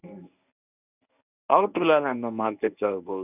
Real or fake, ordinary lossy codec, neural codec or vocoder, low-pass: fake; none; codec, 24 kHz, 0.9 kbps, WavTokenizer, medium speech release version 1; 3.6 kHz